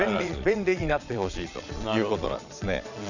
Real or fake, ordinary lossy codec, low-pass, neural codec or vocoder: fake; none; 7.2 kHz; vocoder, 22.05 kHz, 80 mel bands, Vocos